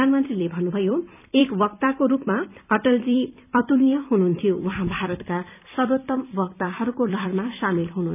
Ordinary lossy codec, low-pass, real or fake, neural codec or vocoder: none; 3.6 kHz; fake; vocoder, 44.1 kHz, 128 mel bands every 256 samples, BigVGAN v2